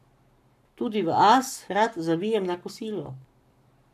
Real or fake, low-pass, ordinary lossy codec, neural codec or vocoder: fake; 14.4 kHz; none; codec, 44.1 kHz, 7.8 kbps, Pupu-Codec